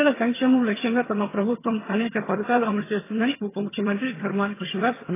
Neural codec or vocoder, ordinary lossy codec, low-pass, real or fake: vocoder, 22.05 kHz, 80 mel bands, HiFi-GAN; AAC, 16 kbps; 3.6 kHz; fake